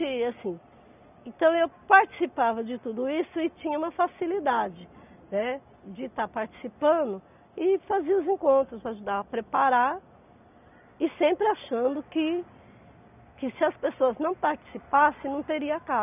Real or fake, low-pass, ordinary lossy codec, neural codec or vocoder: real; 3.6 kHz; none; none